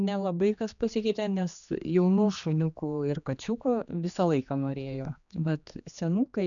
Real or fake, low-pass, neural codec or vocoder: fake; 7.2 kHz; codec, 16 kHz, 2 kbps, X-Codec, HuBERT features, trained on general audio